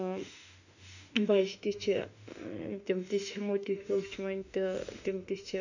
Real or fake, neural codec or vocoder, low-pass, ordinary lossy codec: fake; autoencoder, 48 kHz, 32 numbers a frame, DAC-VAE, trained on Japanese speech; 7.2 kHz; none